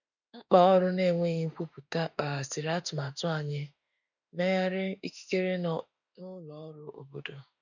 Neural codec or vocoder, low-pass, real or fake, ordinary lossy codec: autoencoder, 48 kHz, 32 numbers a frame, DAC-VAE, trained on Japanese speech; 7.2 kHz; fake; none